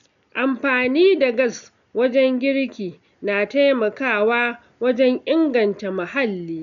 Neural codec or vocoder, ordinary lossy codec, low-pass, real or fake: none; none; 7.2 kHz; real